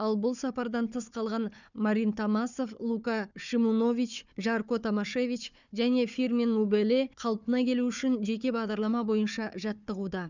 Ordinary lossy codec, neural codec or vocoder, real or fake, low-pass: none; codec, 16 kHz, 4 kbps, FunCodec, trained on Chinese and English, 50 frames a second; fake; 7.2 kHz